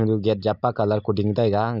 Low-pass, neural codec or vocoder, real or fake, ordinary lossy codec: 5.4 kHz; none; real; none